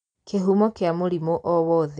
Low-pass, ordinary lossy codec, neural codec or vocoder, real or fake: 9.9 kHz; MP3, 64 kbps; none; real